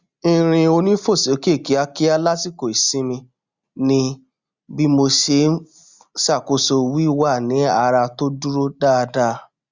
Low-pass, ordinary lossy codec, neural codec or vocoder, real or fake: 7.2 kHz; Opus, 64 kbps; none; real